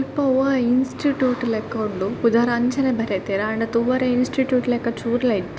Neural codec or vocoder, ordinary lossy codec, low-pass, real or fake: none; none; none; real